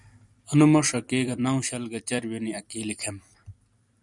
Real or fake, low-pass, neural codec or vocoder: fake; 10.8 kHz; vocoder, 44.1 kHz, 128 mel bands every 256 samples, BigVGAN v2